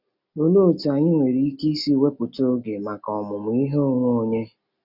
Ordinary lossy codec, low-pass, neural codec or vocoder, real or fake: none; 5.4 kHz; none; real